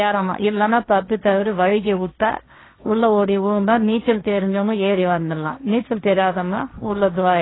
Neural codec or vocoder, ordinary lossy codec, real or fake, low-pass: codec, 16 kHz, 1.1 kbps, Voila-Tokenizer; AAC, 16 kbps; fake; 7.2 kHz